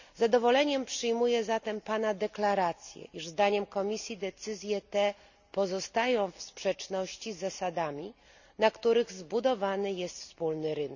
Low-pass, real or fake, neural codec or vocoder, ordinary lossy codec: 7.2 kHz; real; none; none